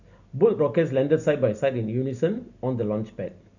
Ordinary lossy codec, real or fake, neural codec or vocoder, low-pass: none; real; none; 7.2 kHz